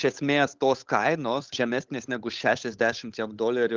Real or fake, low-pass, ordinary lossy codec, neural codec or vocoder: fake; 7.2 kHz; Opus, 32 kbps; codec, 16 kHz, 8 kbps, FunCodec, trained on Chinese and English, 25 frames a second